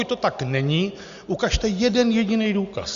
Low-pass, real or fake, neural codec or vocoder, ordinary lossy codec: 7.2 kHz; real; none; Opus, 64 kbps